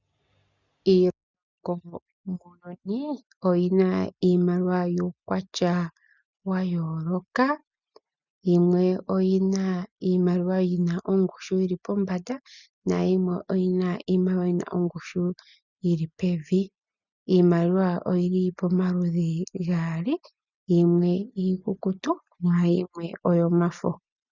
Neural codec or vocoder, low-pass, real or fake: none; 7.2 kHz; real